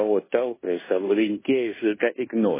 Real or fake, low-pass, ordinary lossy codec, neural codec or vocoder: fake; 3.6 kHz; MP3, 16 kbps; codec, 16 kHz in and 24 kHz out, 0.9 kbps, LongCat-Audio-Codec, fine tuned four codebook decoder